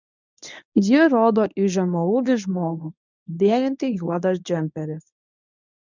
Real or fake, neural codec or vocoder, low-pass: fake; codec, 24 kHz, 0.9 kbps, WavTokenizer, medium speech release version 1; 7.2 kHz